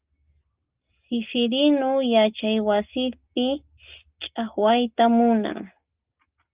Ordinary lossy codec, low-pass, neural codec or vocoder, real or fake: Opus, 24 kbps; 3.6 kHz; none; real